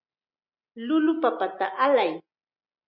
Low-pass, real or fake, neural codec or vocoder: 5.4 kHz; real; none